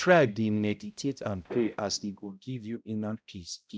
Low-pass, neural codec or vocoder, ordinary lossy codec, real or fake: none; codec, 16 kHz, 0.5 kbps, X-Codec, HuBERT features, trained on balanced general audio; none; fake